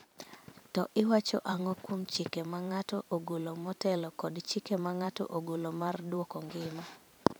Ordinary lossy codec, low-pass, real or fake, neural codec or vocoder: none; none; fake; vocoder, 44.1 kHz, 128 mel bands every 512 samples, BigVGAN v2